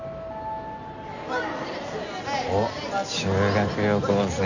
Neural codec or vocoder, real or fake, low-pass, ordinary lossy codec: none; real; 7.2 kHz; none